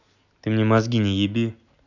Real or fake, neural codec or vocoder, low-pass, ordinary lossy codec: real; none; 7.2 kHz; none